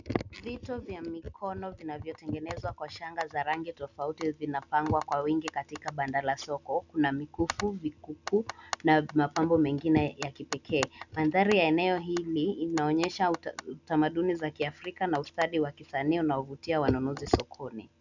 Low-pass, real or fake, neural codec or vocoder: 7.2 kHz; real; none